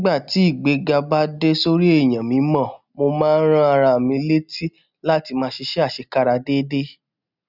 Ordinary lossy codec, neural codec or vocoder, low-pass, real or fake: none; none; 5.4 kHz; real